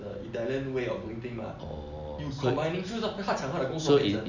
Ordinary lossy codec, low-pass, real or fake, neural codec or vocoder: none; 7.2 kHz; real; none